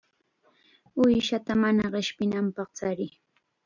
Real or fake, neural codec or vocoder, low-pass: real; none; 7.2 kHz